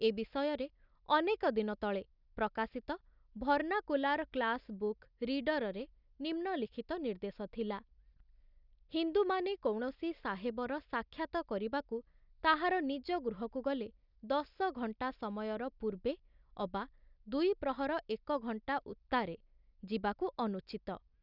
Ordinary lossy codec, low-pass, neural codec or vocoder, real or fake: none; 5.4 kHz; none; real